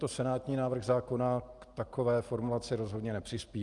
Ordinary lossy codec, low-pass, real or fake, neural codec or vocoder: Opus, 32 kbps; 10.8 kHz; real; none